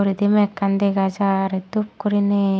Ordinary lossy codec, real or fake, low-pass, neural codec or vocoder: none; real; none; none